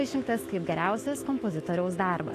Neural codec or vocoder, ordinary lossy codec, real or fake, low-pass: autoencoder, 48 kHz, 128 numbers a frame, DAC-VAE, trained on Japanese speech; AAC, 48 kbps; fake; 14.4 kHz